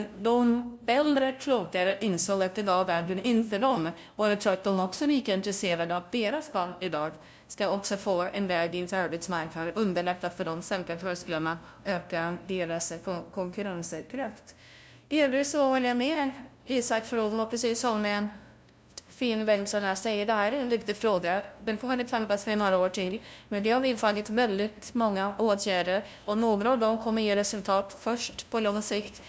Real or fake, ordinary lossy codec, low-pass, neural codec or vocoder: fake; none; none; codec, 16 kHz, 0.5 kbps, FunCodec, trained on LibriTTS, 25 frames a second